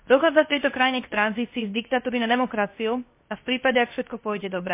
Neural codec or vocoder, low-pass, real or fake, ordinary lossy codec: codec, 16 kHz, 0.7 kbps, FocalCodec; 3.6 kHz; fake; MP3, 24 kbps